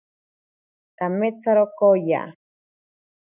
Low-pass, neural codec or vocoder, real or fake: 3.6 kHz; none; real